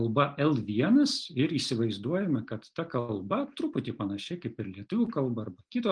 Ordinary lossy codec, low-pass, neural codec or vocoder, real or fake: Opus, 32 kbps; 9.9 kHz; none; real